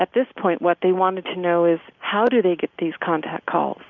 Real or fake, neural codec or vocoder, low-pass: real; none; 7.2 kHz